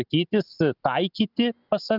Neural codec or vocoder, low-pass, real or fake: none; 5.4 kHz; real